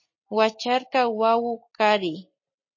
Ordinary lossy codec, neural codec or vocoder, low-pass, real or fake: MP3, 32 kbps; none; 7.2 kHz; real